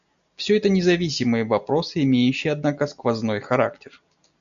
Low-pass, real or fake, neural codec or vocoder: 7.2 kHz; real; none